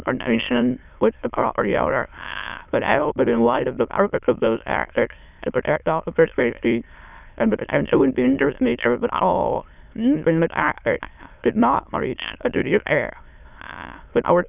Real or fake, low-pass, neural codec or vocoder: fake; 3.6 kHz; autoencoder, 22.05 kHz, a latent of 192 numbers a frame, VITS, trained on many speakers